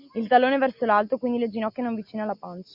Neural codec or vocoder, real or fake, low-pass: none; real; 5.4 kHz